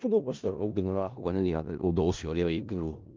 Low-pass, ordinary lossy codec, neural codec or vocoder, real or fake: 7.2 kHz; Opus, 24 kbps; codec, 16 kHz in and 24 kHz out, 0.4 kbps, LongCat-Audio-Codec, four codebook decoder; fake